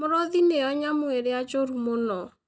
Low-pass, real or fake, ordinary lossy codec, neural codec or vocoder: none; real; none; none